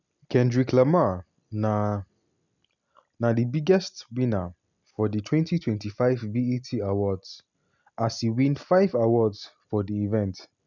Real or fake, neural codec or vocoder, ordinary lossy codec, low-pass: real; none; none; 7.2 kHz